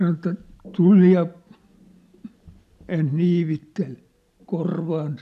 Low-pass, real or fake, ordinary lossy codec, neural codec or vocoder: 14.4 kHz; real; none; none